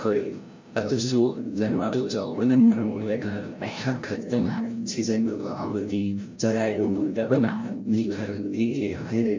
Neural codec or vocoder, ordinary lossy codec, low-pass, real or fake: codec, 16 kHz, 0.5 kbps, FreqCodec, larger model; MP3, 48 kbps; 7.2 kHz; fake